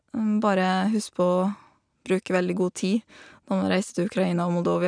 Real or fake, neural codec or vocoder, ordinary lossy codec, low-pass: real; none; none; 9.9 kHz